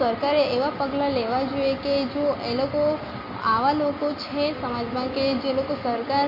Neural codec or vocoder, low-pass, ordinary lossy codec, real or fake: none; 5.4 kHz; MP3, 32 kbps; real